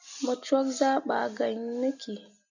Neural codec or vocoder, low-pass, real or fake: none; 7.2 kHz; real